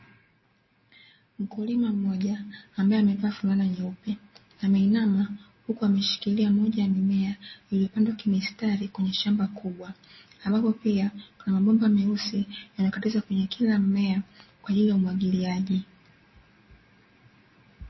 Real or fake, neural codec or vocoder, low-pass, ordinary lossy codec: real; none; 7.2 kHz; MP3, 24 kbps